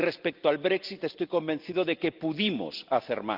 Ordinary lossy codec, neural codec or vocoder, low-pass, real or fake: Opus, 32 kbps; none; 5.4 kHz; real